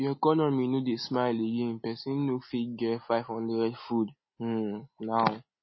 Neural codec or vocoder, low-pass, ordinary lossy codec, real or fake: none; 7.2 kHz; MP3, 24 kbps; real